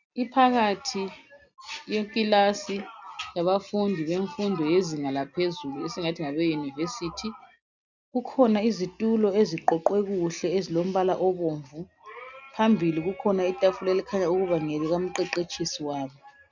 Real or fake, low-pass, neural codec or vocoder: real; 7.2 kHz; none